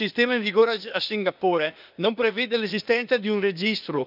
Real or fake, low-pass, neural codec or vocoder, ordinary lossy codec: fake; 5.4 kHz; codec, 16 kHz, 0.8 kbps, ZipCodec; none